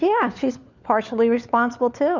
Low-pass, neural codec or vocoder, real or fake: 7.2 kHz; codec, 16 kHz, 4 kbps, FunCodec, trained on LibriTTS, 50 frames a second; fake